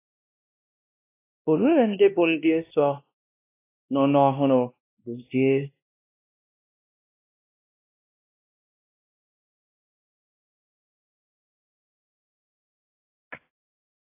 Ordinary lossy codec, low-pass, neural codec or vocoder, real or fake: AAC, 24 kbps; 3.6 kHz; codec, 16 kHz, 1 kbps, X-Codec, WavLM features, trained on Multilingual LibriSpeech; fake